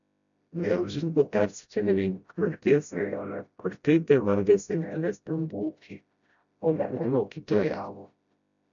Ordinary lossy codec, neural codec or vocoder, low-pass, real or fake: MP3, 96 kbps; codec, 16 kHz, 0.5 kbps, FreqCodec, smaller model; 7.2 kHz; fake